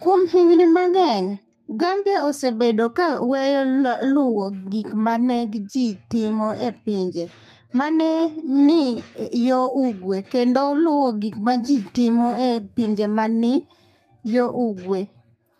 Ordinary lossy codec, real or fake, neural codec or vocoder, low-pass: MP3, 96 kbps; fake; codec, 32 kHz, 1.9 kbps, SNAC; 14.4 kHz